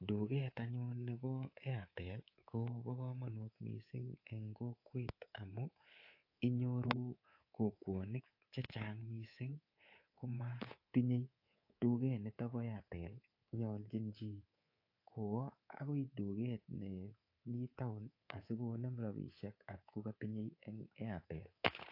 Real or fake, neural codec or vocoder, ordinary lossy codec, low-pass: fake; codec, 24 kHz, 3.1 kbps, DualCodec; AAC, 32 kbps; 5.4 kHz